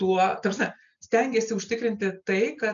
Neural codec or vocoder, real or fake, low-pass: none; real; 7.2 kHz